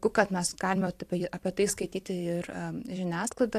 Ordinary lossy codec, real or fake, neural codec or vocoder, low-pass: AAC, 64 kbps; fake; vocoder, 44.1 kHz, 128 mel bands every 256 samples, BigVGAN v2; 14.4 kHz